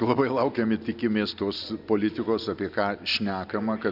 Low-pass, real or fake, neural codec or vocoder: 5.4 kHz; real; none